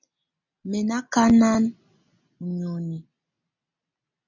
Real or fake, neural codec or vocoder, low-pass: real; none; 7.2 kHz